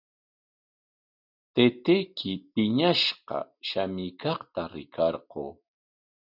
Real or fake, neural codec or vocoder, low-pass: real; none; 5.4 kHz